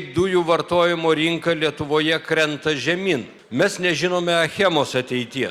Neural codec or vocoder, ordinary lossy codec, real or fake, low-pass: none; Opus, 64 kbps; real; 19.8 kHz